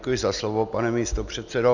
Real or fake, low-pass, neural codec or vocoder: real; 7.2 kHz; none